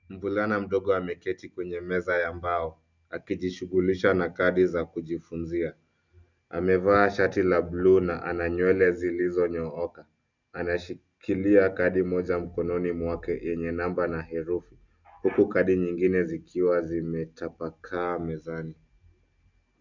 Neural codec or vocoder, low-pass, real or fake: none; 7.2 kHz; real